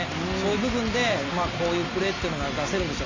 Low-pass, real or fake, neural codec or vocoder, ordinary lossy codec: 7.2 kHz; real; none; AAC, 32 kbps